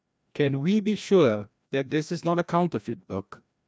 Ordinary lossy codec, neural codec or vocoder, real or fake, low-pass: none; codec, 16 kHz, 1 kbps, FreqCodec, larger model; fake; none